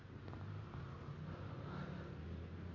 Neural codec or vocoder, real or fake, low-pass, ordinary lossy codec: none; real; 7.2 kHz; none